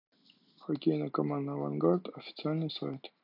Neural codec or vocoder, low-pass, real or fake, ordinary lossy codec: none; 5.4 kHz; real; MP3, 48 kbps